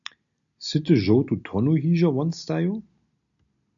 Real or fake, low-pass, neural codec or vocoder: real; 7.2 kHz; none